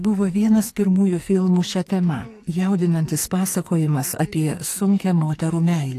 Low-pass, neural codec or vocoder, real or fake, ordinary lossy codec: 14.4 kHz; codec, 44.1 kHz, 2.6 kbps, SNAC; fake; AAC, 64 kbps